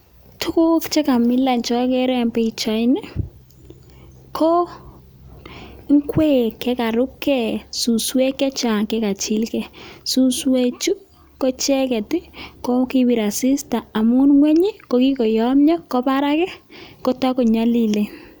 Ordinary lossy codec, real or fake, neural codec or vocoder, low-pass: none; real; none; none